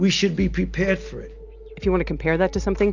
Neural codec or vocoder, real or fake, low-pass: none; real; 7.2 kHz